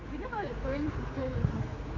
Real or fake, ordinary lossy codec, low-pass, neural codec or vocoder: fake; AAC, 32 kbps; 7.2 kHz; codec, 16 kHz, 4 kbps, X-Codec, HuBERT features, trained on general audio